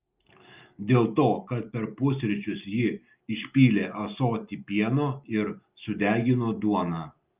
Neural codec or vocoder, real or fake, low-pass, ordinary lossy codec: none; real; 3.6 kHz; Opus, 24 kbps